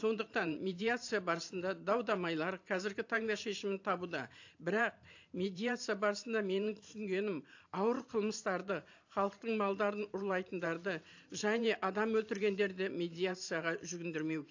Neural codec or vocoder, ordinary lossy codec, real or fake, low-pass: vocoder, 44.1 kHz, 128 mel bands every 512 samples, BigVGAN v2; AAC, 48 kbps; fake; 7.2 kHz